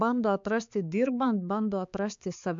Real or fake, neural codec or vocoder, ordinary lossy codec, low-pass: fake; codec, 16 kHz, 4 kbps, X-Codec, HuBERT features, trained on balanced general audio; MP3, 64 kbps; 7.2 kHz